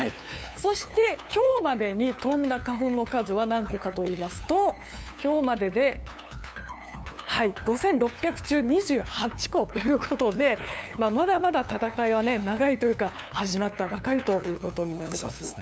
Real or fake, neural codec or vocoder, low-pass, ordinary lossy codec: fake; codec, 16 kHz, 2 kbps, FunCodec, trained on LibriTTS, 25 frames a second; none; none